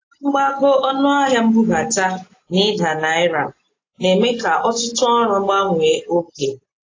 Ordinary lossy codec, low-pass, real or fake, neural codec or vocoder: AAC, 32 kbps; 7.2 kHz; real; none